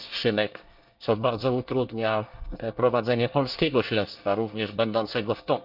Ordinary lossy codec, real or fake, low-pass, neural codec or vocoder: Opus, 24 kbps; fake; 5.4 kHz; codec, 24 kHz, 1 kbps, SNAC